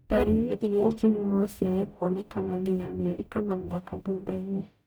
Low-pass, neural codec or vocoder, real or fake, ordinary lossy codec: none; codec, 44.1 kHz, 0.9 kbps, DAC; fake; none